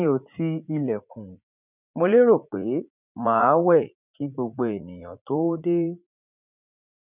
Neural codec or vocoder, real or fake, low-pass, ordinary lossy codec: vocoder, 44.1 kHz, 80 mel bands, Vocos; fake; 3.6 kHz; none